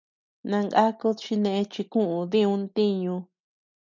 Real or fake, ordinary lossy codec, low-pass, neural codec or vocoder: real; MP3, 64 kbps; 7.2 kHz; none